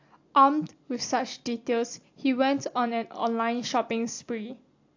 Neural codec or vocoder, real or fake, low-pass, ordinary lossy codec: none; real; 7.2 kHz; AAC, 48 kbps